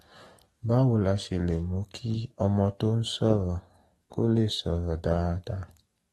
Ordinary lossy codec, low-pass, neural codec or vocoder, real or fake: AAC, 32 kbps; 19.8 kHz; codec, 44.1 kHz, 7.8 kbps, DAC; fake